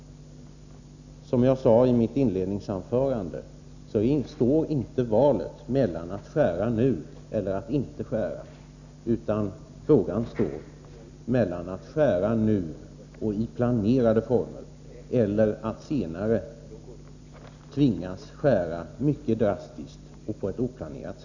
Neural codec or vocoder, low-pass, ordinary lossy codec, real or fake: none; 7.2 kHz; none; real